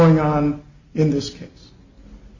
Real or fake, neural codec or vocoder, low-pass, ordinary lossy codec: real; none; 7.2 kHz; Opus, 64 kbps